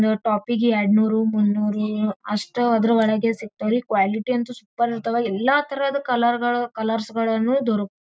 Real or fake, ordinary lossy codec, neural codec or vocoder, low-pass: real; none; none; none